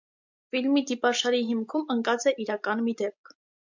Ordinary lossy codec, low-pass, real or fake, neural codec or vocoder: MP3, 64 kbps; 7.2 kHz; real; none